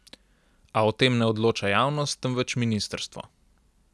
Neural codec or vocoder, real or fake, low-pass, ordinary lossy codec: none; real; none; none